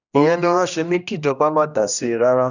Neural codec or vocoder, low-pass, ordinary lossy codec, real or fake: codec, 16 kHz, 1 kbps, X-Codec, HuBERT features, trained on general audio; 7.2 kHz; none; fake